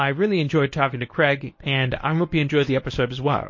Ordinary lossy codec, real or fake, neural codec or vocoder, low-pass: MP3, 32 kbps; fake; codec, 24 kHz, 0.9 kbps, WavTokenizer, medium speech release version 1; 7.2 kHz